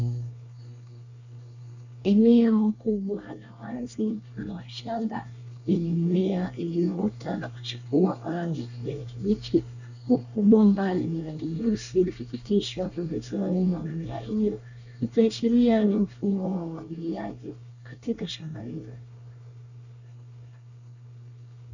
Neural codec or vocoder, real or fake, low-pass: codec, 24 kHz, 1 kbps, SNAC; fake; 7.2 kHz